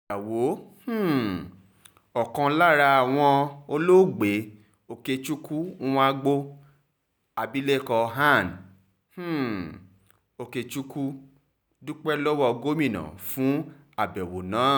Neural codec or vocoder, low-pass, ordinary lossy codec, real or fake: none; none; none; real